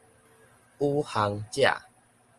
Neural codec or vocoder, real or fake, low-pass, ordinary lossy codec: none; real; 10.8 kHz; Opus, 24 kbps